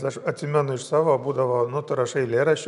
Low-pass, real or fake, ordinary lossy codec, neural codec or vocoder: 10.8 kHz; real; MP3, 96 kbps; none